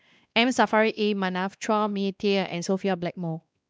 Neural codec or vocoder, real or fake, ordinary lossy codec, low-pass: codec, 16 kHz, 2 kbps, X-Codec, WavLM features, trained on Multilingual LibriSpeech; fake; none; none